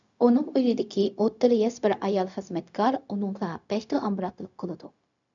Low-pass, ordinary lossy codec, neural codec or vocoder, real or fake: 7.2 kHz; MP3, 96 kbps; codec, 16 kHz, 0.4 kbps, LongCat-Audio-Codec; fake